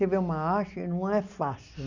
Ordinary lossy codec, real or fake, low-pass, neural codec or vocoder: none; real; 7.2 kHz; none